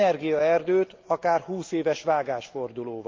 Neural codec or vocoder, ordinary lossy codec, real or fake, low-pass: none; Opus, 24 kbps; real; 7.2 kHz